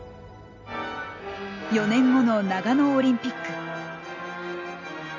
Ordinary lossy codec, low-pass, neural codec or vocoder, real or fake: none; 7.2 kHz; none; real